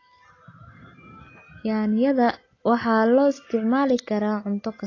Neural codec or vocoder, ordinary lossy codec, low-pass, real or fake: none; AAC, 32 kbps; 7.2 kHz; real